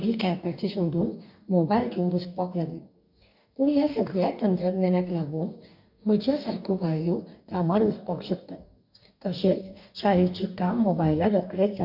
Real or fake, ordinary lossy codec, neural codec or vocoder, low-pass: fake; none; codec, 44.1 kHz, 2.6 kbps, DAC; 5.4 kHz